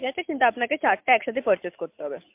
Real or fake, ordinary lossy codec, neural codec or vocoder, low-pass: real; MP3, 32 kbps; none; 3.6 kHz